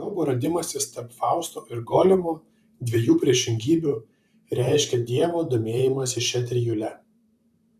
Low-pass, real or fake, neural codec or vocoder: 14.4 kHz; fake; vocoder, 44.1 kHz, 128 mel bands, Pupu-Vocoder